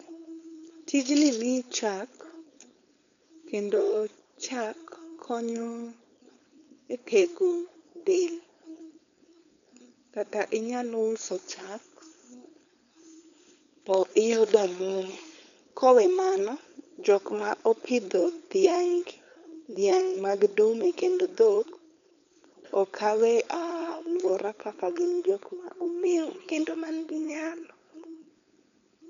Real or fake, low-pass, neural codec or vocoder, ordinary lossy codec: fake; 7.2 kHz; codec, 16 kHz, 4.8 kbps, FACodec; none